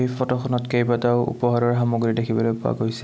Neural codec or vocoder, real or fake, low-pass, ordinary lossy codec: none; real; none; none